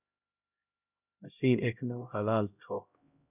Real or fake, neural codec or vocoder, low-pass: fake; codec, 16 kHz, 0.5 kbps, X-Codec, HuBERT features, trained on LibriSpeech; 3.6 kHz